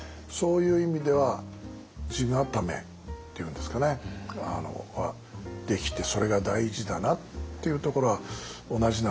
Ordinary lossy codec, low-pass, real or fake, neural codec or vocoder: none; none; real; none